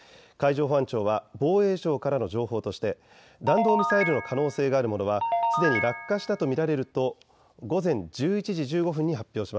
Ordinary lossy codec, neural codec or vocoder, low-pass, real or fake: none; none; none; real